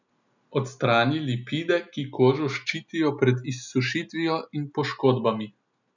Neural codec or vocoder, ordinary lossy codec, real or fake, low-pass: none; none; real; 7.2 kHz